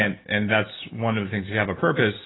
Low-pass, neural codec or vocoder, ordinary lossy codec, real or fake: 7.2 kHz; none; AAC, 16 kbps; real